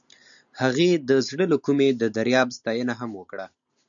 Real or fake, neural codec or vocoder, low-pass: real; none; 7.2 kHz